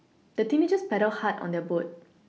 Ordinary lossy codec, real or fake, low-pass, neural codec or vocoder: none; real; none; none